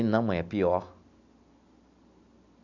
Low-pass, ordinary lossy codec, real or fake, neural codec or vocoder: 7.2 kHz; none; real; none